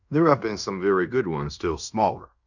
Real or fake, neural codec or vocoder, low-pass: fake; codec, 16 kHz in and 24 kHz out, 0.9 kbps, LongCat-Audio-Codec, fine tuned four codebook decoder; 7.2 kHz